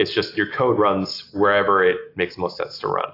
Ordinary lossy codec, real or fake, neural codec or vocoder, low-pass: AAC, 32 kbps; real; none; 5.4 kHz